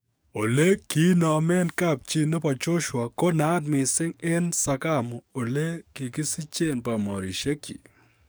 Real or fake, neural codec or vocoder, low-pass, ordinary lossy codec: fake; codec, 44.1 kHz, 7.8 kbps, DAC; none; none